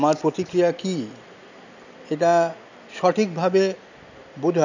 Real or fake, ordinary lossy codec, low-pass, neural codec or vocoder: real; none; 7.2 kHz; none